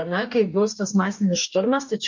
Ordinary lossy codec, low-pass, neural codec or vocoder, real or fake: MP3, 48 kbps; 7.2 kHz; codec, 44.1 kHz, 2.6 kbps, DAC; fake